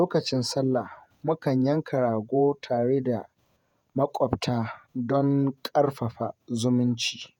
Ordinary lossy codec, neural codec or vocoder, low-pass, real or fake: none; vocoder, 48 kHz, 128 mel bands, Vocos; 19.8 kHz; fake